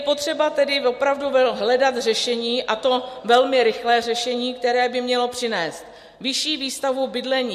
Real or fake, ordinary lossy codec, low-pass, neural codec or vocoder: real; MP3, 64 kbps; 14.4 kHz; none